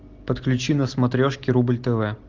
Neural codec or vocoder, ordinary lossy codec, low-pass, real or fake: none; Opus, 32 kbps; 7.2 kHz; real